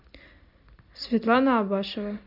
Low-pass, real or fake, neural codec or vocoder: 5.4 kHz; real; none